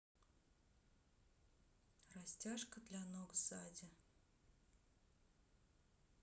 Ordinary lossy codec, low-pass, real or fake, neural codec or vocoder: none; none; real; none